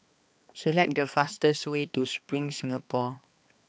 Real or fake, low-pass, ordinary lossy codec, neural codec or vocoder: fake; none; none; codec, 16 kHz, 2 kbps, X-Codec, HuBERT features, trained on balanced general audio